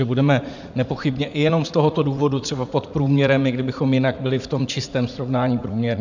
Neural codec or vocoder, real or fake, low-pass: vocoder, 44.1 kHz, 80 mel bands, Vocos; fake; 7.2 kHz